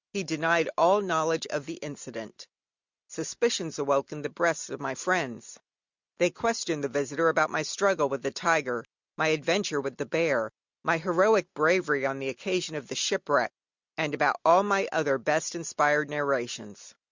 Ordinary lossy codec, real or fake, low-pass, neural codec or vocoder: Opus, 64 kbps; real; 7.2 kHz; none